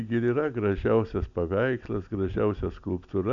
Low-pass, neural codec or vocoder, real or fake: 7.2 kHz; none; real